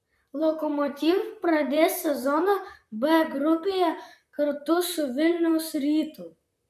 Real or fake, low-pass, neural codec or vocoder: fake; 14.4 kHz; vocoder, 44.1 kHz, 128 mel bands, Pupu-Vocoder